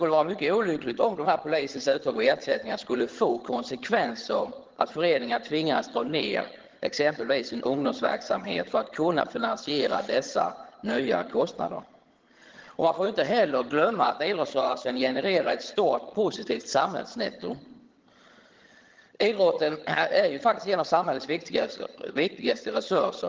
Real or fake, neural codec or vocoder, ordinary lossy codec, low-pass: fake; vocoder, 22.05 kHz, 80 mel bands, HiFi-GAN; Opus, 16 kbps; 7.2 kHz